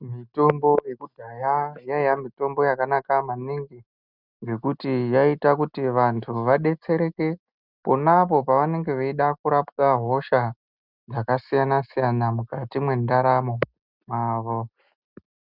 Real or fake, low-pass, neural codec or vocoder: real; 5.4 kHz; none